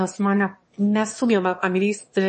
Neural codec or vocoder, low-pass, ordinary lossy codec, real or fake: autoencoder, 22.05 kHz, a latent of 192 numbers a frame, VITS, trained on one speaker; 9.9 kHz; MP3, 32 kbps; fake